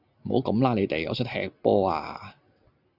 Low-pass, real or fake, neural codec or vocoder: 5.4 kHz; real; none